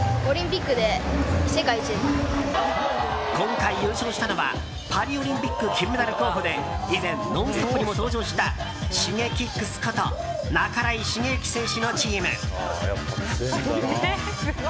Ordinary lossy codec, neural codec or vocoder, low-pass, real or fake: none; none; none; real